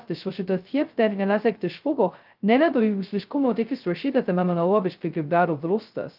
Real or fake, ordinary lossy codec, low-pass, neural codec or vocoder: fake; Opus, 24 kbps; 5.4 kHz; codec, 16 kHz, 0.2 kbps, FocalCodec